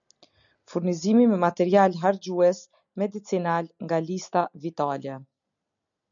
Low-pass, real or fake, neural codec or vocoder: 7.2 kHz; real; none